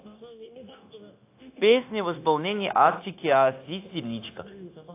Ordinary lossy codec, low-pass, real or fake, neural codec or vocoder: AAC, 24 kbps; 3.6 kHz; fake; codec, 16 kHz, 0.9 kbps, LongCat-Audio-Codec